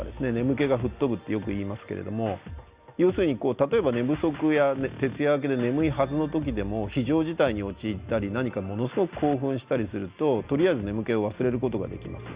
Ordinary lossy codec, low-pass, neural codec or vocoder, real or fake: Opus, 64 kbps; 3.6 kHz; none; real